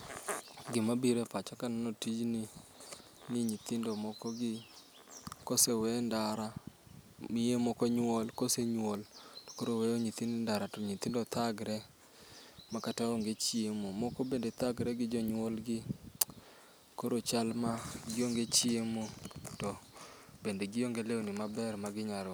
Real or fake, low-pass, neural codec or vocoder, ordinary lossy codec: real; none; none; none